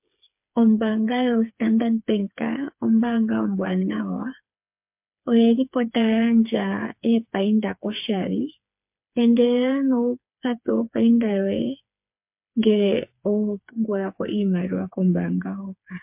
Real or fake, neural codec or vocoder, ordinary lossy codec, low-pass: fake; codec, 16 kHz, 4 kbps, FreqCodec, smaller model; MP3, 32 kbps; 3.6 kHz